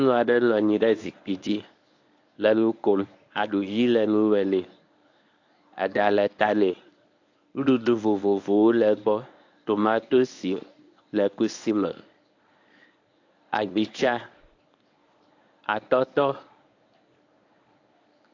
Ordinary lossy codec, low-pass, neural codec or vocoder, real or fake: AAC, 48 kbps; 7.2 kHz; codec, 24 kHz, 0.9 kbps, WavTokenizer, medium speech release version 1; fake